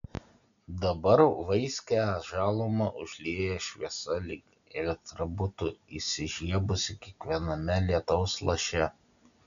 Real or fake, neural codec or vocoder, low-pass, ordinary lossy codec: real; none; 7.2 kHz; MP3, 96 kbps